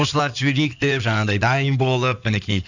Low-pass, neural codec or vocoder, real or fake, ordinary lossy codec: 7.2 kHz; codec, 16 kHz in and 24 kHz out, 2.2 kbps, FireRedTTS-2 codec; fake; none